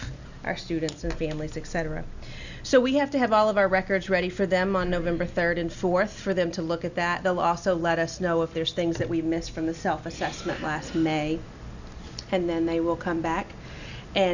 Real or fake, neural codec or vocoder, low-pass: real; none; 7.2 kHz